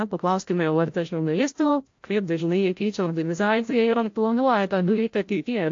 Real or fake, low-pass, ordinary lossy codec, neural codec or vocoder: fake; 7.2 kHz; AAC, 48 kbps; codec, 16 kHz, 0.5 kbps, FreqCodec, larger model